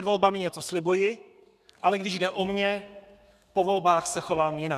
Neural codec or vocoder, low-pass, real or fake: codec, 32 kHz, 1.9 kbps, SNAC; 14.4 kHz; fake